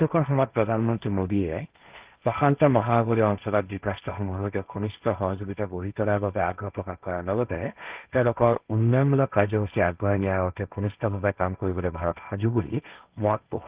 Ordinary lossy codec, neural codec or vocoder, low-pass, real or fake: Opus, 24 kbps; codec, 16 kHz, 1.1 kbps, Voila-Tokenizer; 3.6 kHz; fake